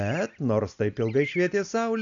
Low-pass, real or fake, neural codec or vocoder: 7.2 kHz; real; none